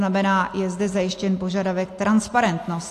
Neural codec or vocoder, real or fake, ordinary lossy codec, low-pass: none; real; AAC, 64 kbps; 14.4 kHz